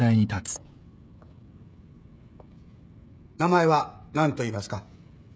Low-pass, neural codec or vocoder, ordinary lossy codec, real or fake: none; codec, 16 kHz, 16 kbps, FreqCodec, smaller model; none; fake